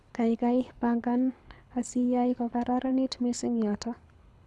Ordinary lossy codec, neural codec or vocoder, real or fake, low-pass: none; codec, 24 kHz, 6 kbps, HILCodec; fake; none